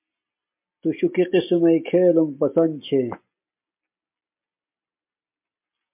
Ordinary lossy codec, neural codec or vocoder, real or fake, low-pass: MP3, 32 kbps; none; real; 3.6 kHz